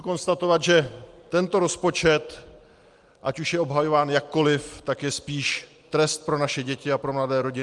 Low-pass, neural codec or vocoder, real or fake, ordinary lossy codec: 10.8 kHz; none; real; Opus, 24 kbps